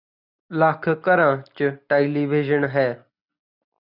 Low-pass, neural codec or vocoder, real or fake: 5.4 kHz; none; real